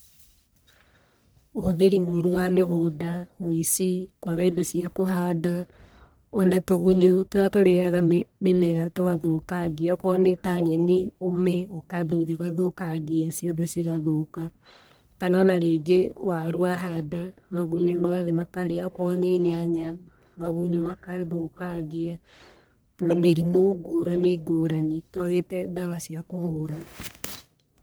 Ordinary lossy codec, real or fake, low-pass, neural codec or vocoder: none; fake; none; codec, 44.1 kHz, 1.7 kbps, Pupu-Codec